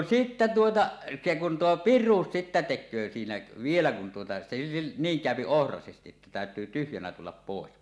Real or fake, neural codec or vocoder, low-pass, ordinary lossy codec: real; none; none; none